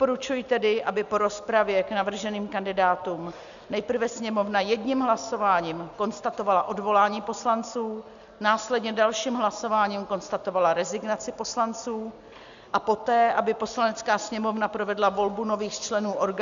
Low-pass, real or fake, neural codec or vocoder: 7.2 kHz; real; none